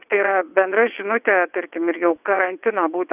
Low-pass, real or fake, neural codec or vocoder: 3.6 kHz; fake; vocoder, 22.05 kHz, 80 mel bands, WaveNeXt